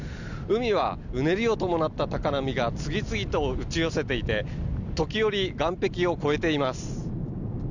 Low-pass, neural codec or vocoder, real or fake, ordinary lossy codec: 7.2 kHz; none; real; none